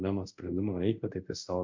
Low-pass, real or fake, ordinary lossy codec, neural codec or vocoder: 7.2 kHz; fake; MP3, 64 kbps; codec, 24 kHz, 0.5 kbps, DualCodec